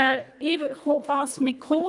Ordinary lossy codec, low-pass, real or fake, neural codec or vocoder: none; 10.8 kHz; fake; codec, 24 kHz, 1.5 kbps, HILCodec